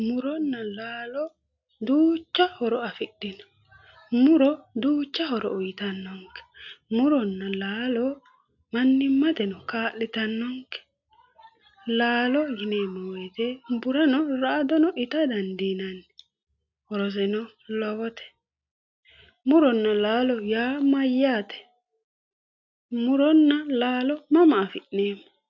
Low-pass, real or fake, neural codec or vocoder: 7.2 kHz; real; none